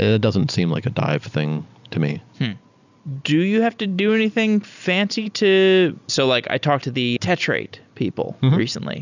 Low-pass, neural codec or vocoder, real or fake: 7.2 kHz; none; real